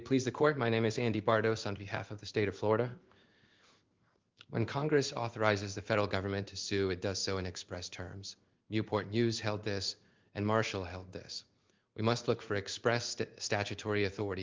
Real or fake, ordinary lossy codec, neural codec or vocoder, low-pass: fake; Opus, 32 kbps; codec, 16 kHz in and 24 kHz out, 1 kbps, XY-Tokenizer; 7.2 kHz